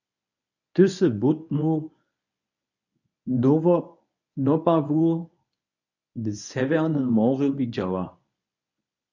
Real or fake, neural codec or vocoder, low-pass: fake; codec, 24 kHz, 0.9 kbps, WavTokenizer, medium speech release version 1; 7.2 kHz